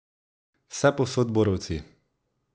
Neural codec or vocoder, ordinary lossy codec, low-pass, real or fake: none; none; none; real